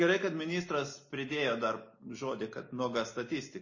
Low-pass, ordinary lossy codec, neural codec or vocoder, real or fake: 7.2 kHz; MP3, 32 kbps; none; real